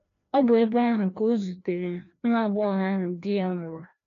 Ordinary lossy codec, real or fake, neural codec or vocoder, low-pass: none; fake; codec, 16 kHz, 1 kbps, FreqCodec, larger model; 7.2 kHz